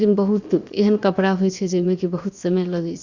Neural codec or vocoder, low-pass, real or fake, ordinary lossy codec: codec, 16 kHz, 0.7 kbps, FocalCodec; 7.2 kHz; fake; Opus, 64 kbps